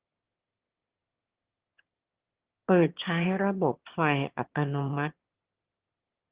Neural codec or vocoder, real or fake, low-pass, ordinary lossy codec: autoencoder, 22.05 kHz, a latent of 192 numbers a frame, VITS, trained on one speaker; fake; 3.6 kHz; Opus, 16 kbps